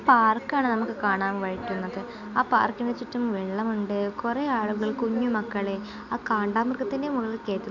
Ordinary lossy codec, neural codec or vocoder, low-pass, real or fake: none; none; 7.2 kHz; real